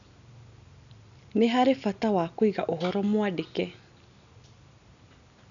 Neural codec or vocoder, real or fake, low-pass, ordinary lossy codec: none; real; 7.2 kHz; none